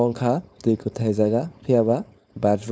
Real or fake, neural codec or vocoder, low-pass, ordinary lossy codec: fake; codec, 16 kHz, 4.8 kbps, FACodec; none; none